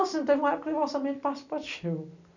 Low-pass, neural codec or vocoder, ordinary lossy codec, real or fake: 7.2 kHz; none; none; real